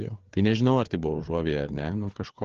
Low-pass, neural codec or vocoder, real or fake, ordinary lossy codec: 7.2 kHz; codec, 16 kHz, 4 kbps, FreqCodec, larger model; fake; Opus, 16 kbps